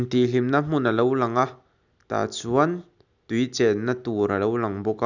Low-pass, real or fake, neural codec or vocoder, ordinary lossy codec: 7.2 kHz; real; none; none